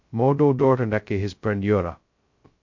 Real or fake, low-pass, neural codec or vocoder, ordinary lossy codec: fake; 7.2 kHz; codec, 16 kHz, 0.2 kbps, FocalCodec; MP3, 48 kbps